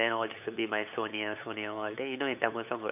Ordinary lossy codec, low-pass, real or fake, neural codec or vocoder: none; 3.6 kHz; fake; codec, 16 kHz, 8 kbps, FunCodec, trained on LibriTTS, 25 frames a second